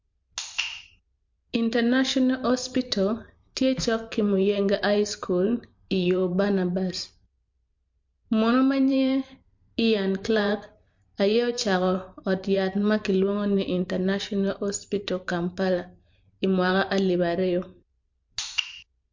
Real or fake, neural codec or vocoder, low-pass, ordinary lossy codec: fake; vocoder, 44.1 kHz, 128 mel bands every 512 samples, BigVGAN v2; 7.2 kHz; MP3, 48 kbps